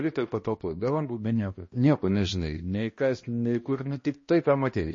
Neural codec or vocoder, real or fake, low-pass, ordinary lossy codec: codec, 16 kHz, 1 kbps, X-Codec, HuBERT features, trained on balanced general audio; fake; 7.2 kHz; MP3, 32 kbps